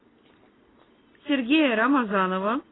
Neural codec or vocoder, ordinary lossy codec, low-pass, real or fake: none; AAC, 16 kbps; 7.2 kHz; real